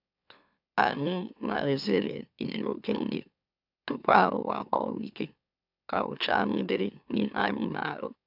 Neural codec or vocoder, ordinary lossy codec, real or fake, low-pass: autoencoder, 44.1 kHz, a latent of 192 numbers a frame, MeloTTS; none; fake; 5.4 kHz